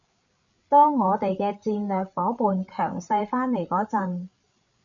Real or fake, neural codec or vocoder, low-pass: fake; codec, 16 kHz, 8 kbps, FreqCodec, larger model; 7.2 kHz